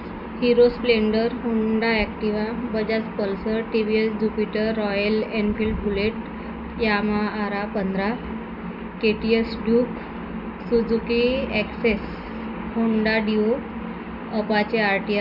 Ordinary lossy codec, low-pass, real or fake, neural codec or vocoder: none; 5.4 kHz; real; none